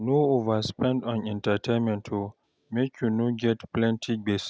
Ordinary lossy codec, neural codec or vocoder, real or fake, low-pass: none; none; real; none